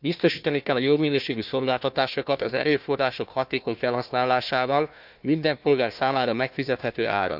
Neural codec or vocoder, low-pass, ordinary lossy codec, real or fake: codec, 16 kHz, 1 kbps, FunCodec, trained on Chinese and English, 50 frames a second; 5.4 kHz; none; fake